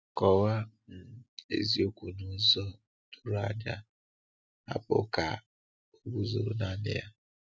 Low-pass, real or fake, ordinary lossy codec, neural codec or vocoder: none; real; none; none